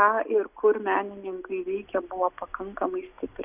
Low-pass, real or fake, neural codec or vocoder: 3.6 kHz; real; none